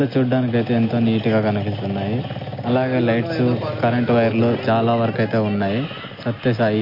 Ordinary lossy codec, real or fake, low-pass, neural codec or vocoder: MP3, 32 kbps; real; 5.4 kHz; none